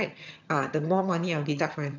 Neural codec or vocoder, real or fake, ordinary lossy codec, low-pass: vocoder, 22.05 kHz, 80 mel bands, HiFi-GAN; fake; none; 7.2 kHz